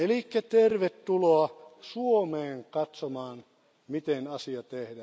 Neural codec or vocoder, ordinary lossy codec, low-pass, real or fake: none; none; none; real